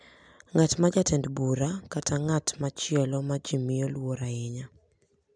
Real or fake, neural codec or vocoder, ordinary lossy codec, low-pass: real; none; none; 9.9 kHz